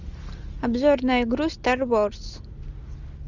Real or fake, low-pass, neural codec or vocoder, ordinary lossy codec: real; 7.2 kHz; none; Opus, 64 kbps